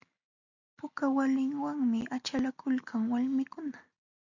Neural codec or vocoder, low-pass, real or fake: none; 7.2 kHz; real